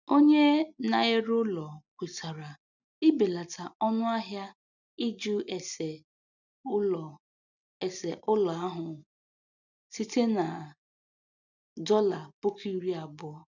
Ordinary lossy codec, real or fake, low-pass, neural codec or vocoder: none; real; 7.2 kHz; none